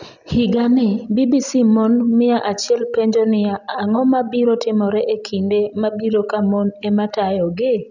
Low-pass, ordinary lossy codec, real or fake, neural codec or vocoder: 7.2 kHz; none; real; none